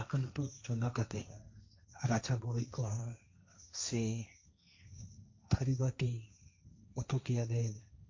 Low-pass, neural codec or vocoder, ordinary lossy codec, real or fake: 7.2 kHz; codec, 16 kHz, 1.1 kbps, Voila-Tokenizer; MP3, 64 kbps; fake